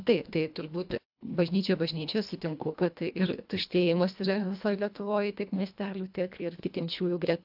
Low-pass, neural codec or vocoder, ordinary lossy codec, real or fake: 5.4 kHz; codec, 24 kHz, 1.5 kbps, HILCodec; AAC, 48 kbps; fake